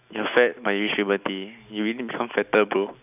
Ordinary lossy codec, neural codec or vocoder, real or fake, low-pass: none; none; real; 3.6 kHz